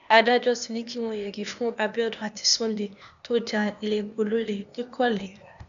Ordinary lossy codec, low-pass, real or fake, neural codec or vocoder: none; 7.2 kHz; fake; codec, 16 kHz, 0.8 kbps, ZipCodec